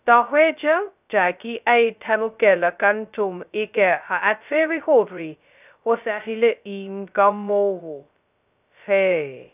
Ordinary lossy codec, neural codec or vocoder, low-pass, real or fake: none; codec, 16 kHz, 0.2 kbps, FocalCodec; 3.6 kHz; fake